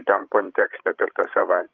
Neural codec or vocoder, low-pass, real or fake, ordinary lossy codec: codec, 16 kHz, 16 kbps, FreqCodec, larger model; 7.2 kHz; fake; Opus, 32 kbps